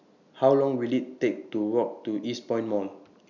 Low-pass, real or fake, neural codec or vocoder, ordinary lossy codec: 7.2 kHz; real; none; none